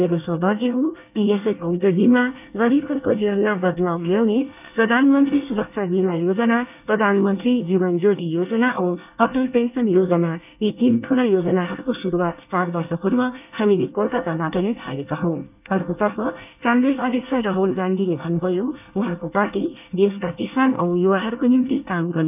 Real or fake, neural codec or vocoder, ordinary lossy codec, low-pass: fake; codec, 24 kHz, 1 kbps, SNAC; none; 3.6 kHz